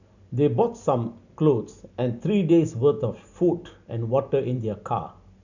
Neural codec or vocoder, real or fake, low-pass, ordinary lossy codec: none; real; 7.2 kHz; none